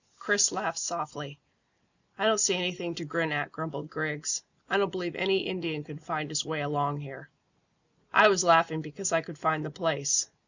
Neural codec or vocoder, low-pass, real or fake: none; 7.2 kHz; real